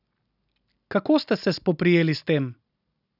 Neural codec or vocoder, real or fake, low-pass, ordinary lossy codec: none; real; 5.4 kHz; none